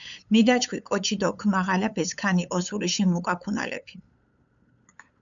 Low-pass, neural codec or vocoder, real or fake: 7.2 kHz; codec, 16 kHz, 8 kbps, FunCodec, trained on LibriTTS, 25 frames a second; fake